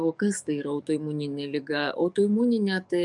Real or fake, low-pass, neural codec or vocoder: fake; 10.8 kHz; codec, 44.1 kHz, 7.8 kbps, DAC